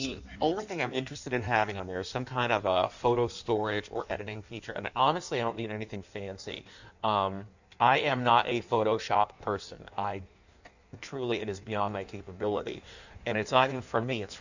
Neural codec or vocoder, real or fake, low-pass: codec, 16 kHz in and 24 kHz out, 1.1 kbps, FireRedTTS-2 codec; fake; 7.2 kHz